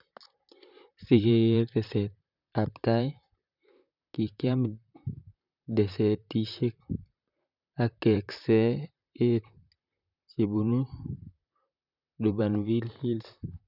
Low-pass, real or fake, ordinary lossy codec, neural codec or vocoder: 5.4 kHz; fake; none; vocoder, 44.1 kHz, 128 mel bands, Pupu-Vocoder